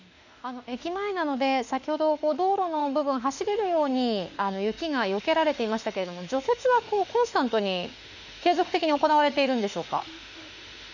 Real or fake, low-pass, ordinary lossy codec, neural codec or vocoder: fake; 7.2 kHz; none; autoencoder, 48 kHz, 32 numbers a frame, DAC-VAE, trained on Japanese speech